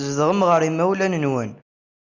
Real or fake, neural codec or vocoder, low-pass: real; none; 7.2 kHz